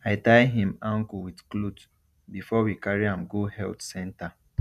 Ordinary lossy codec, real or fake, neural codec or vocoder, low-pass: none; real; none; 14.4 kHz